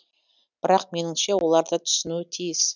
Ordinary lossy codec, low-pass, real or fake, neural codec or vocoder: none; 7.2 kHz; real; none